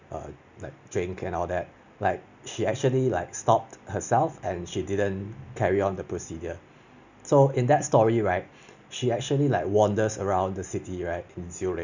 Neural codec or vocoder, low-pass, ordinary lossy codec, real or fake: none; 7.2 kHz; none; real